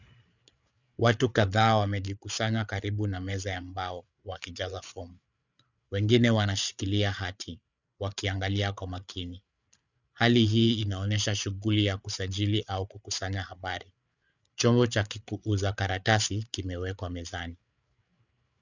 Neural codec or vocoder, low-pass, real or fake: codec, 16 kHz, 8 kbps, FreqCodec, larger model; 7.2 kHz; fake